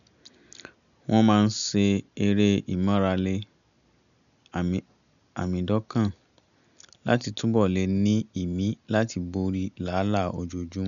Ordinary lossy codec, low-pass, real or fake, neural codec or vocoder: none; 7.2 kHz; real; none